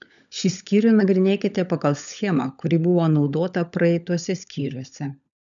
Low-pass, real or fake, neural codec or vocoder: 7.2 kHz; fake; codec, 16 kHz, 16 kbps, FunCodec, trained on LibriTTS, 50 frames a second